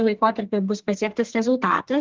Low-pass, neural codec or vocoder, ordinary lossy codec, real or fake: 7.2 kHz; codec, 32 kHz, 1.9 kbps, SNAC; Opus, 16 kbps; fake